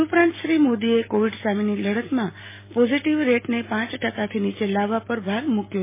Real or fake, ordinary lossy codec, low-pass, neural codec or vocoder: real; MP3, 16 kbps; 3.6 kHz; none